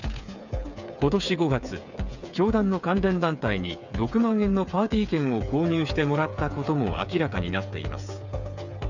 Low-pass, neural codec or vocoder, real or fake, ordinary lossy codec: 7.2 kHz; codec, 16 kHz, 8 kbps, FreqCodec, smaller model; fake; none